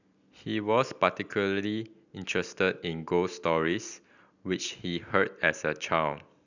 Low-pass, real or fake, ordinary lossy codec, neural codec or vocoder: 7.2 kHz; real; none; none